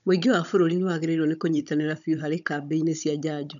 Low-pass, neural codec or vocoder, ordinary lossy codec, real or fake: 7.2 kHz; codec, 16 kHz, 16 kbps, FunCodec, trained on Chinese and English, 50 frames a second; none; fake